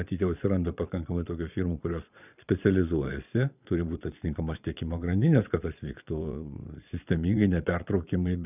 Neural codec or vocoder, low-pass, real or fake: vocoder, 22.05 kHz, 80 mel bands, Vocos; 3.6 kHz; fake